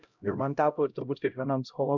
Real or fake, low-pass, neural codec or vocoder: fake; 7.2 kHz; codec, 16 kHz, 0.5 kbps, X-Codec, HuBERT features, trained on LibriSpeech